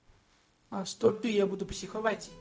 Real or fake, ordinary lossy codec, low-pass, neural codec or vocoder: fake; none; none; codec, 16 kHz, 0.4 kbps, LongCat-Audio-Codec